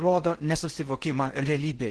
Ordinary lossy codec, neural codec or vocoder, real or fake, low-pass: Opus, 16 kbps; codec, 16 kHz in and 24 kHz out, 0.6 kbps, FocalCodec, streaming, 4096 codes; fake; 10.8 kHz